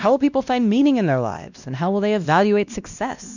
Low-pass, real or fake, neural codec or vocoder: 7.2 kHz; fake; codec, 16 kHz, 1 kbps, X-Codec, WavLM features, trained on Multilingual LibriSpeech